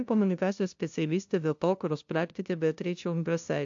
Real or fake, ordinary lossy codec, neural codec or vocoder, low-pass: fake; AAC, 64 kbps; codec, 16 kHz, 0.5 kbps, FunCodec, trained on LibriTTS, 25 frames a second; 7.2 kHz